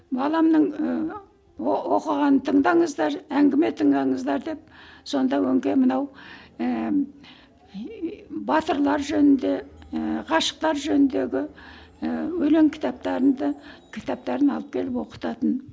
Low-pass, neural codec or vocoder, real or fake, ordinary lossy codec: none; none; real; none